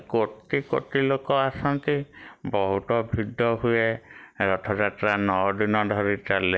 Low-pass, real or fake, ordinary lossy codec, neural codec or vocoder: none; real; none; none